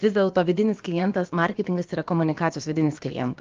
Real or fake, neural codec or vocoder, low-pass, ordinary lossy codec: fake; codec, 16 kHz, about 1 kbps, DyCAST, with the encoder's durations; 7.2 kHz; Opus, 32 kbps